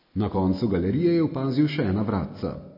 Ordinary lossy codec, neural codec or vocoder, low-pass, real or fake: MP3, 24 kbps; none; 5.4 kHz; real